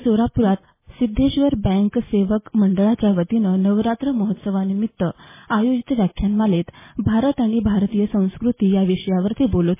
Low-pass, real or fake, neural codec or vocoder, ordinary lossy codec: 3.6 kHz; real; none; MP3, 16 kbps